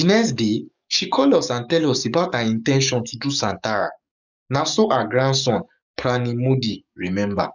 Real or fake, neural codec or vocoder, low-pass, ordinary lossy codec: fake; codec, 44.1 kHz, 7.8 kbps, DAC; 7.2 kHz; none